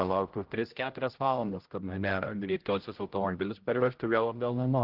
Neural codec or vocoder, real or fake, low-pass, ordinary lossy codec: codec, 16 kHz, 0.5 kbps, X-Codec, HuBERT features, trained on general audio; fake; 5.4 kHz; Opus, 16 kbps